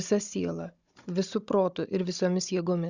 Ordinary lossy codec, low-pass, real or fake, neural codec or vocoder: Opus, 64 kbps; 7.2 kHz; fake; codec, 16 kHz, 16 kbps, FunCodec, trained on Chinese and English, 50 frames a second